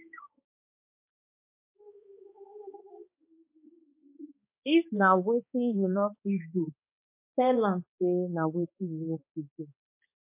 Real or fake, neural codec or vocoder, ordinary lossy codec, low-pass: fake; codec, 16 kHz, 2 kbps, X-Codec, HuBERT features, trained on balanced general audio; MP3, 24 kbps; 3.6 kHz